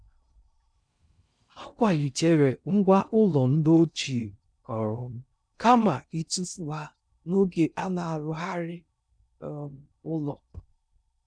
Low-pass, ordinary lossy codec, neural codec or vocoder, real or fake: 9.9 kHz; none; codec, 16 kHz in and 24 kHz out, 0.6 kbps, FocalCodec, streaming, 2048 codes; fake